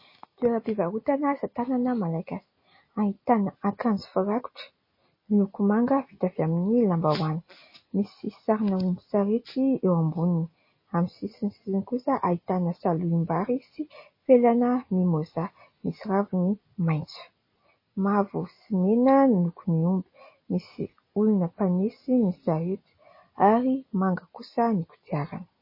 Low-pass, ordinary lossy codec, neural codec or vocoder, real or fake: 5.4 kHz; MP3, 24 kbps; none; real